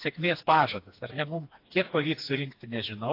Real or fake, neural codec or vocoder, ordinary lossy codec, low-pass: fake; codec, 16 kHz, 2 kbps, FreqCodec, smaller model; AAC, 32 kbps; 5.4 kHz